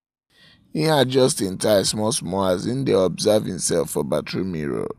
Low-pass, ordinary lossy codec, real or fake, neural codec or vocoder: 14.4 kHz; none; real; none